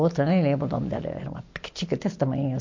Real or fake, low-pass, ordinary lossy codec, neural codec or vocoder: real; 7.2 kHz; MP3, 48 kbps; none